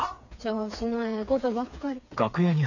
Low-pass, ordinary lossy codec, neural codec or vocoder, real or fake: 7.2 kHz; none; codec, 16 kHz, 4 kbps, FreqCodec, smaller model; fake